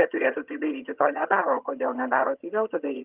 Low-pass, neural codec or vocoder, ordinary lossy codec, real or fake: 3.6 kHz; vocoder, 22.05 kHz, 80 mel bands, HiFi-GAN; Opus, 24 kbps; fake